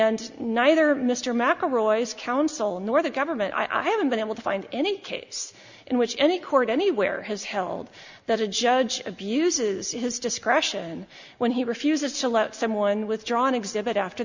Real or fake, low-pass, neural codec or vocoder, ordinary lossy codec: real; 7.2 kHz; none; Opus, 64 kbps